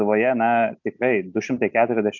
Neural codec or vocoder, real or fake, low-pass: none; real; 7.2 kHz